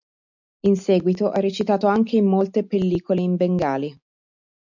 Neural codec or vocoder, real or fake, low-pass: none; real; 7.2 kHz